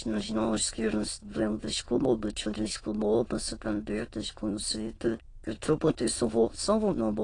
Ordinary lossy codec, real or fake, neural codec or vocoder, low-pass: AAC, 32 kbps; fake; autoencoder, 22.05 kHz, a latent of 192 numbers a frame, VITS, trained on many speakers; 9.9 kHz